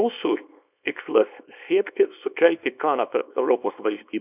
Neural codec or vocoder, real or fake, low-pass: codec, 24 kHz, 0.9 kbps, WavTokenizer, small release; fake; 3.6 kHz